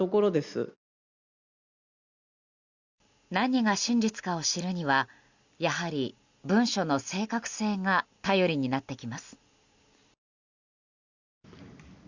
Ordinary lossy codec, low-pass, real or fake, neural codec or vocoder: Opus, 64 kbps; 7.2 kHz; real; none